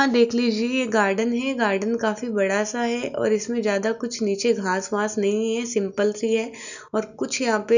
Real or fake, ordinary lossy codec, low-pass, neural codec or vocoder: real; none; 7.2 kHz; none